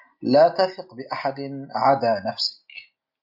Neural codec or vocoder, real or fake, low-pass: none; real; 5.4 kHz